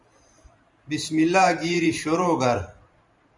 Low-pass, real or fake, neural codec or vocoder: 10.8 kHz; fake; vocoder, 44.1 kHz, 128 mel bands every 512 samples, BigVGAN v2